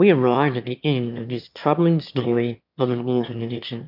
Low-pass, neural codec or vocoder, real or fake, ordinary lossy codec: 5.4 kHz; autoencoder, 22.05 kHz, a latent of 192 numbers a frame, VITS, trained on one speaker; fake; MP3, 48 kbps